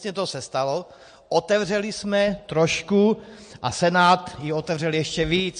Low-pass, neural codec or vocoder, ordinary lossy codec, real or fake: 9.9 kHz; none; MP3, 48 kbps; real